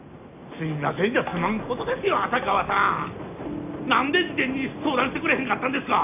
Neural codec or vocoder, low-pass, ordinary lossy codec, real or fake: none; 3.6 kHz; none; real